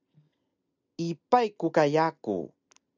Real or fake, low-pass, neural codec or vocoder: real; 7.2 kHz; none